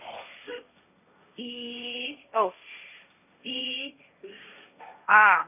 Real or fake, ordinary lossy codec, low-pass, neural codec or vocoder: fake; none; 3.6 kHz; codec, 16 kHz, 1.1 kbps, Voila-Tokenizer